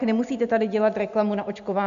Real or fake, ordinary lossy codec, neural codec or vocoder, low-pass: real; AAC, 96 kbps; none; 7.2 kHz